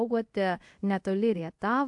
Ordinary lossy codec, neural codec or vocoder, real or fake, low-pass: AAC, 64 kbps; codec, 24 kHz, 0.5 kbps, DualCodec; fake; 10.8 kHz